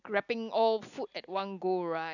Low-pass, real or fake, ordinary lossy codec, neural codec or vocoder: 7.2 kHz; real; none; none